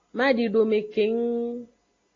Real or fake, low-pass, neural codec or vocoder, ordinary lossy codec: real; 7.2 kHz; none; AAC, 32 kbps